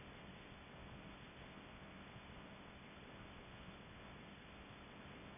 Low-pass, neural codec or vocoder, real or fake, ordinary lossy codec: 3.6 kHz; codec, 24 kHz, 0.9 kbps, WavTokenizer, small release; fake; MP3, 16 kbps